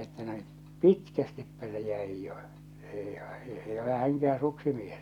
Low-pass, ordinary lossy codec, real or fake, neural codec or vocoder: 19.8 kHz; none; real; none